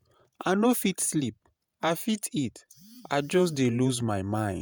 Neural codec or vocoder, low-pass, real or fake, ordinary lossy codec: vocoder, 48 kHz, 128 mel bands, Vocos; none; fake; none